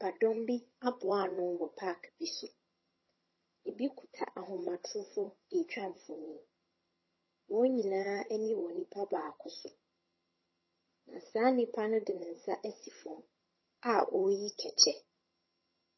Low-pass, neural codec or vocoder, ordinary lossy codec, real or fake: 7.2 kHz; vocoder, 22.05 kHz, 80 mel bands, HiFi-GAN; MP3, 24 kbps; fake